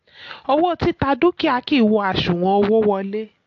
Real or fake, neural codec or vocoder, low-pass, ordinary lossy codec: real; none; 7.2 kHz; AAC, 48 kbps